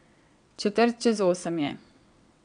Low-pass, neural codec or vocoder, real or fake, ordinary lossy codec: 9.9 kHz; vocoder, 22.05 kHz, 80 mel bands, WaveNeXt; fake; none